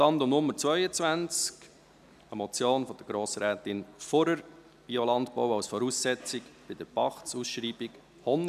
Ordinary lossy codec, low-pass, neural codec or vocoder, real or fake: none; 14.4 kHz; none; real